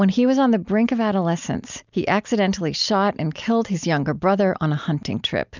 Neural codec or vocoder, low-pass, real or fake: none; 7.2 kHz; real